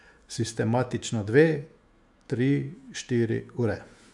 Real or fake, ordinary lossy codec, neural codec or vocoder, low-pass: fake; none; autoencoder, 48 kHz, 128 numbers a frame, DAC-VAE, trained on Japanese speech; 10.8 kHz